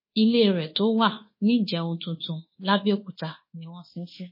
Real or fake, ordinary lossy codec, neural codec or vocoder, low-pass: fake; MP3, 24 kbps; codec, 24 kHz, 1.2 kbps, DualCodec; 5.4 kHz